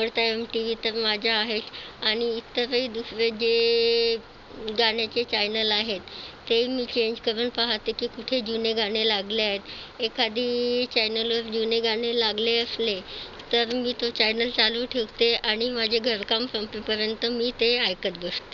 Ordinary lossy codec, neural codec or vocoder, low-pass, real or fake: none; none; 7.2 kHz; real